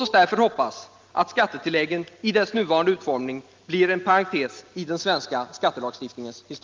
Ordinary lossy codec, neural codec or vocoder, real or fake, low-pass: Opus, 24 kbps; none; real; 7.2 kHz